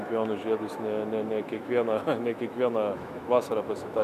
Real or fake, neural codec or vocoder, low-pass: fake; autoencoder, 48 kHz, 128 numbers a frame, DAC-VAE, trained on Japanese speech; 14.4 kHz